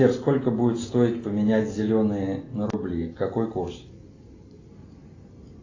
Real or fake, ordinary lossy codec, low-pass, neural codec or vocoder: real; AAC, 32 kbps; 7.2 kHz; none